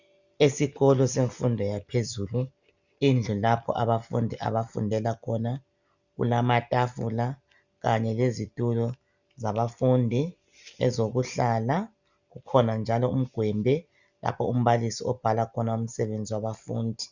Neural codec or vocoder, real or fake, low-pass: none; real; 7.2 kHz